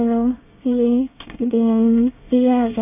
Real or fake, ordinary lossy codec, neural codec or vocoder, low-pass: fake; none; codec, 24 kHz, 1 kbps, SNAC; 3.6 kHz